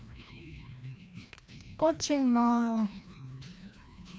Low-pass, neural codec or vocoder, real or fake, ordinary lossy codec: none; codec, 16 kHz, 1 kbps, FreqCodec, larger model; fake; none